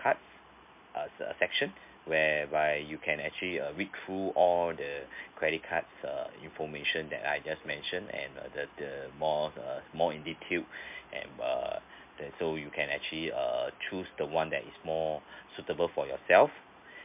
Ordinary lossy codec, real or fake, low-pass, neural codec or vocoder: MP3, 32 kbps; real; 3.6 kHz; none